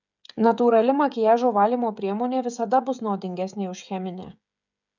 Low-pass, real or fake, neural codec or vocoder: 7.2 kHz; fake; codec, 16 kHz, 16 kbps, FreqCodec, smaller model